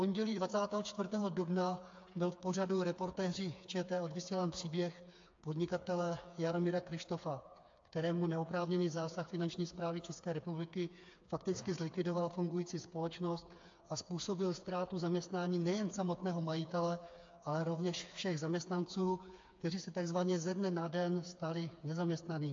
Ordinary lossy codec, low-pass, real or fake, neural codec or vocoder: AAC, 48 kbps; 7.2 kHz; fake; codec, 16 kHz, 4 kbps, FreqCodec, smaller model